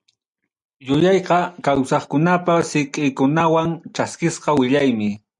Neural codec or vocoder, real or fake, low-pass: none; real; 10.8 kHz